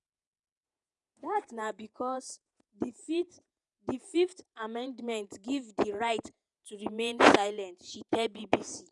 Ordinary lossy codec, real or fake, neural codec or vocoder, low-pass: none; fake; vocoder, 48 kHz, 128 mel bands, Vocos; 10.8 kHz